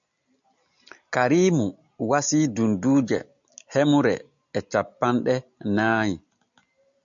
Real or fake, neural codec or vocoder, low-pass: real; none; 7.2 kHz